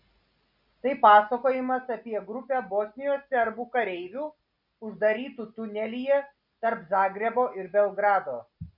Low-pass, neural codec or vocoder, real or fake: 5.4 kHz; none; real